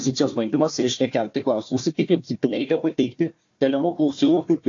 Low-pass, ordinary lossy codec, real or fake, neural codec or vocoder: 7.2 kHz; AAC, 48 kbps; fake; codec, 16 kHz, 1 kbps, FunCodec, trained on Chinese and English, 50 frames a second